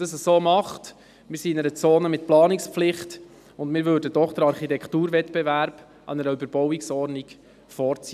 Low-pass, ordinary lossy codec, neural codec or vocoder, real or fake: 14.4 kHz; none; none; real